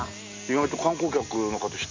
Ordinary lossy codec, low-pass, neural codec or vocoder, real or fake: MP3, 64 kbps; 7.2 kHz; none; real